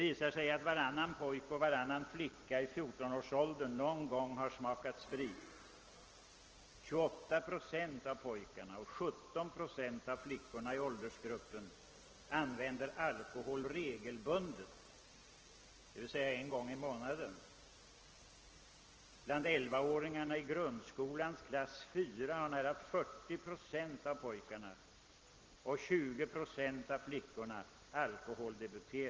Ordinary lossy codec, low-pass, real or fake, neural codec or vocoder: Opus, 16 kbps; 7.2 kHz; real; none